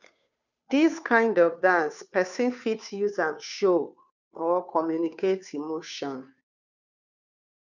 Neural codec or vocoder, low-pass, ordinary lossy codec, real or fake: codec, 16 kHz, 2 kbps, FunCodec, trained on Chinese and English, 25 frames a second; 7.2 kHz; none; fake